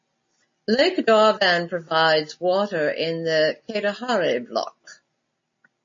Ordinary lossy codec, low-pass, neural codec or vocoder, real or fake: MP3, 32 kbps; 7.2 kHz; none; real